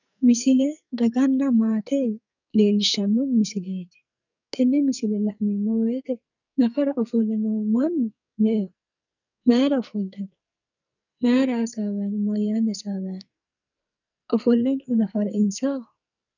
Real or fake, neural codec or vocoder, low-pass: fake; codec, 44.1 kHz, 2.6 kbps, SNAC; 7.2 kHz